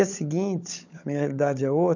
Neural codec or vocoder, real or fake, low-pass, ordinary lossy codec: codec, 16 kHz, 16 kbps, FunCodec, trained on LibriTTS, 50 frames a second; fake; 7.2 kHz; none